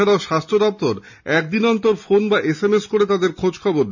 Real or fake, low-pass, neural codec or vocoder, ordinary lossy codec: real; 7.2 kHz; none; none